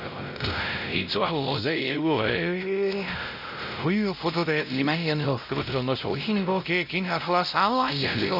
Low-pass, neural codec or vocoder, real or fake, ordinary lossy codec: 5.4 kHz; codec, 16 kHz, 0.5 kbps, X-Codec, WavLM features, trained on Multilingual LibriSpeech; fake; none